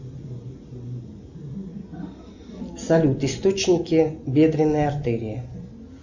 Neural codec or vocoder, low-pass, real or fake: none; 7.2 kHz; real